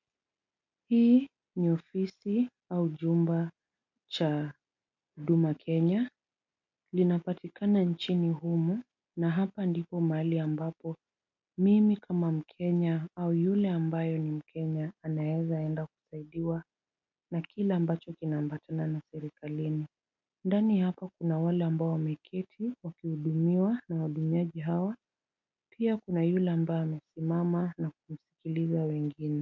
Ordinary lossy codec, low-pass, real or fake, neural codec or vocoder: MP3, 48 kbps; 7.2 kHz; real; none